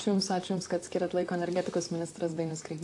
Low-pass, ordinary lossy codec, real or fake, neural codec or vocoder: 10.8 kHz; AAC, 48 kbps; fake; vocoder, 44.1 kHz, 128 mel bands, Pupu-Vocoder